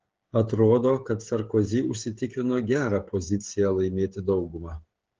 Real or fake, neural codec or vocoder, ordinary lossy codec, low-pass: fake; codec, 16 kHz, 16 kbps, FreqCodec, smaller model; Opus, 16 kbps; 7.2 kHz